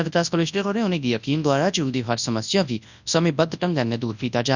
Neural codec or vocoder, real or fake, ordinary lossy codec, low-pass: codec, 24 kHz, 0.9 kbps, WavTokenizer, large speech release; fake; none; 7.2 kHz